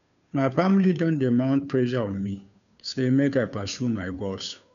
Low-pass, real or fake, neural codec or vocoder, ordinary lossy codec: 7.2 kHz; fake; codec, 16 kHz, 2 kbps, FunCodec, trained on Chinese and English, 25 frames a second; none